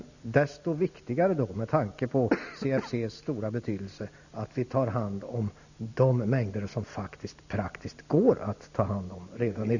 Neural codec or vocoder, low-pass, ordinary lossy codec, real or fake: none; 7.2 kHz; none; real